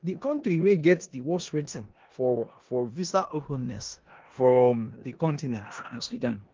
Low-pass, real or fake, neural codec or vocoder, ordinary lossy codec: 7.2 kHz; fake; codec, 16 kHz in and 24 kHz out, 0.9 kbps, LongCat-Audio-Codec, four codebook decoder; Opus, 32 kbps